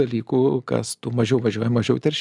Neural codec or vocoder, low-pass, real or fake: none; 10.8 kHz; real